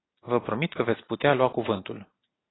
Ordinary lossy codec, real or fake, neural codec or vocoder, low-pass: AAC, 16 kbps; real; none; 7.2 kHz